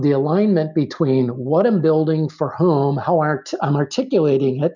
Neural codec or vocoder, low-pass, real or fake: none; 7.2 kHz; real